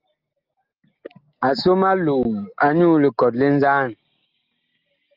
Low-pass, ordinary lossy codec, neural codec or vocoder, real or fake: 5.4 kHz; Opus, 24 kbps; none; real